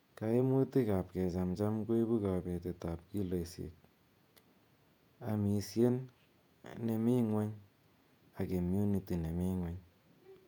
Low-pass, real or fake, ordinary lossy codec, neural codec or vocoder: 19.8 kHz; fake; none; vocoder, 48 kHz, 128 mel bands, Vocos